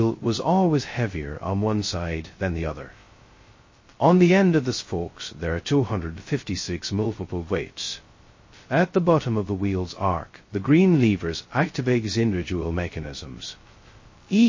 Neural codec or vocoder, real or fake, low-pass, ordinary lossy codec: codec, 16 kHz, 0.2 kbps, FocalCodec; fake; 7.2 kHz; MP3, 32 kbps